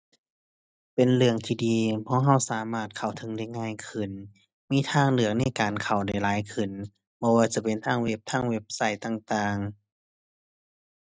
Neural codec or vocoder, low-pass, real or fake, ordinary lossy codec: none; none; real; none